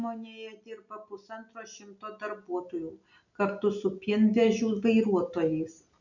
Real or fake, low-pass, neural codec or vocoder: real; 7.2 kHz; none